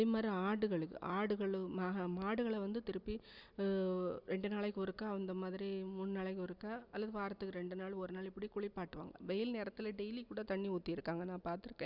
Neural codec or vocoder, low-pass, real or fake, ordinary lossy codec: none; 5.4 kHz; real; Opus, 64 kbps